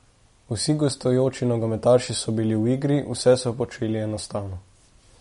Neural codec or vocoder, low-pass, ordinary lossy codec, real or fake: none; 10.8 kHz; MP3, 48 kbps; real